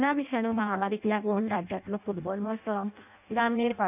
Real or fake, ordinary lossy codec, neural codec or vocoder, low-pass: fake; none; codec, 16 kHz in and 24 kHz out, 0.6 kbps, FireRedTTS-2 codec; 3.6 kHz